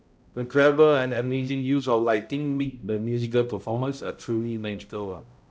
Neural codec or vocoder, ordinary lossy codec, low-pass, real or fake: codec, 16 kHz, 0.5 kbps, X-Codec, HuBERT features, trained on balanced general audio; none; none; fake